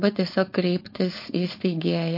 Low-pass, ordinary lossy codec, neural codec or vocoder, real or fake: 5.4 kHz; MP3, 32 kbps; codec, 16 kHz, 4.8 kbps, FACodec; fake